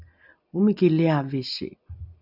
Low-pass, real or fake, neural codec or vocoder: 5.4 kHz; real; none